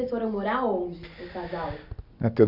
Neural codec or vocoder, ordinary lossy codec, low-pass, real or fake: none; none; 5.4 kHz; real